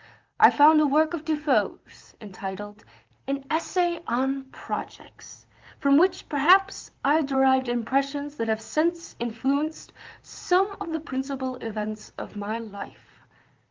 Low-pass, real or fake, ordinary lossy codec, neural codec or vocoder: 7.2 kHz; fake; Opus, 32 kbps; vocoder, 44.1 kHz, 128 mel bands, Pupu-Vocoder